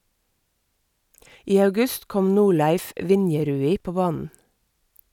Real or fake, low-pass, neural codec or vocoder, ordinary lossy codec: real; 19.8 kHz; none; none